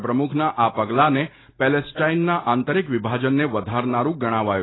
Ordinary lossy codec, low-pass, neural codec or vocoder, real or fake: AAC, 16 kbps; 7.2 kHz; none; real